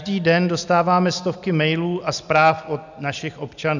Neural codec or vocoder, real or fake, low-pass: none; real; 7.2 kHz